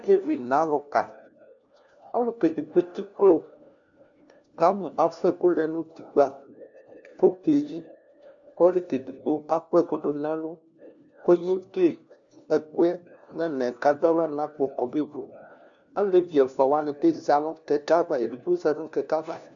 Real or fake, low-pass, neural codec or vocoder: fake; 7.2 kHz; codec, 16 kHz, 1 kbps, FunCodec, trained on LibriTTS, 50 frames a second